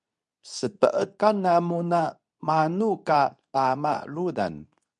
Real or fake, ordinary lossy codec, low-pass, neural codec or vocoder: fake; MP3, 96 kbps; 10.8 kHz; codec, 24 kHz, 0.9 kbps, WavTokenizer, medium speech release version 2